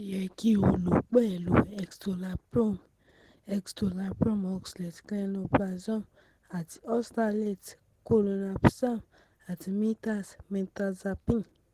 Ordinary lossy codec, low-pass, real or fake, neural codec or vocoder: Opus, 16 kbps; 14.4 kHz; real; none